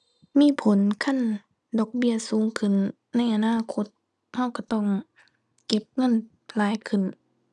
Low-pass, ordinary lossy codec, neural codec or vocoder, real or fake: none; none; none; real